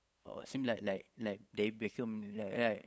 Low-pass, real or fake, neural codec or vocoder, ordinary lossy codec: none; fake; codec, 16 kHz, 8 kbps, FunCodec, trained on LibriTTS, 25 frames a second; none